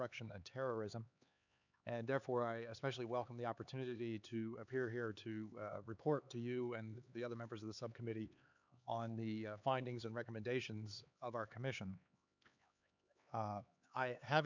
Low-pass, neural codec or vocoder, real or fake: 7.2 kHz; codec, 16 kHz, 4 kbps, X-Codec, HuBERT features, trained on LibriSpeech; fake